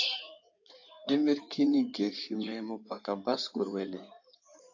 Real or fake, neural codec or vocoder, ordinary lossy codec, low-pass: fake; codec, 16 kHz in and 24 kHz out, 2.2 kbps, FireRedTTS-2 codec; AAC, 48 kbps; 7.2 kHz